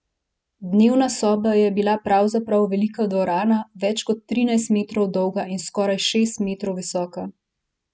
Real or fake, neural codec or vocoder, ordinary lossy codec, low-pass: real; none; none; none